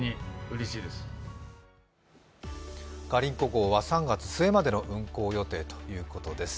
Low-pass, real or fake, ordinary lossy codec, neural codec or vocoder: none; real; none; none